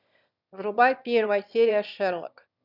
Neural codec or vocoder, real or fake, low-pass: autoencoder, 22.05 kHz, a latent of 192 numbers a frame, VITS, trained on one speaker; fake; 5.4 kHz